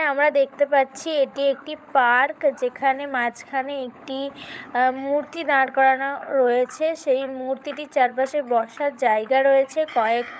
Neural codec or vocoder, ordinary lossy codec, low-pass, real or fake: codec, 16 kHz, 16 kbps, FunCodec, trained on LibriTTS, 50 frames a second; none; none; fake